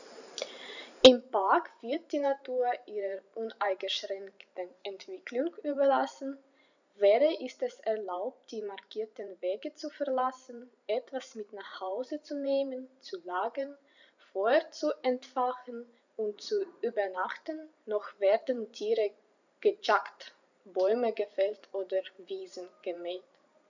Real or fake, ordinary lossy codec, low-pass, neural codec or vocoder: fake; none; 7.2 kHz; vocoder, 44.1 kHz, 128 mel bands every 512 samples, BigVGAN v2